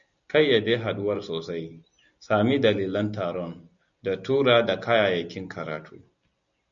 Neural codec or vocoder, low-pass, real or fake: none; 7.2 kHz; real